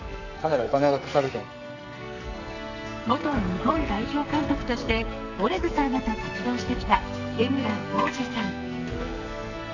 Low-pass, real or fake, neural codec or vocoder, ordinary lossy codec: 7.2 kHz; fake; codec, 44.1 kHz, 2.6 kbps, SNAC; none